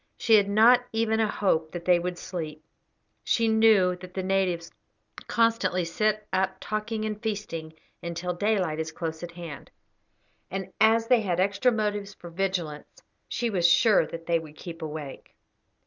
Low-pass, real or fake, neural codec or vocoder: 7.2 kHz; real; none